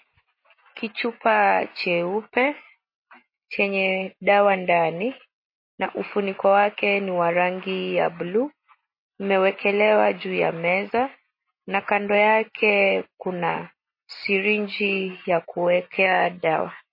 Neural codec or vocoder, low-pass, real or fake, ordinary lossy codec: none; 5.4 kHz; real; MP3, 24 kbps